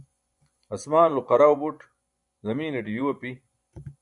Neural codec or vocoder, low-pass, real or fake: vocoder, 24 kHz, 100 mel bands, Vocos; 10.8 kHz; fake